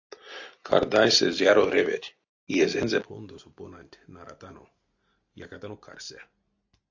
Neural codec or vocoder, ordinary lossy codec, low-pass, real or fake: none; AAC, 48 kbps; 7.2 kHz; real